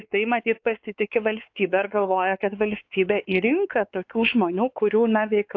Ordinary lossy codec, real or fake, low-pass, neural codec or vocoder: AAC, 48 kbps; fake; 7.2 kHz; codec, 16 kHz, 4 kbps, X-Codec, WavLM features, trained on Multilingual LibriSpeech